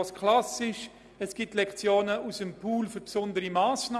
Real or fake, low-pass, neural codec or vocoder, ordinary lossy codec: real; none; none; none